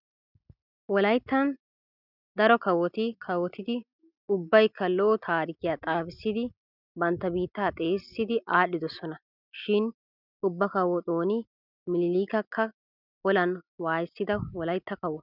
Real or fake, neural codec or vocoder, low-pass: real; none; 5.4 kHz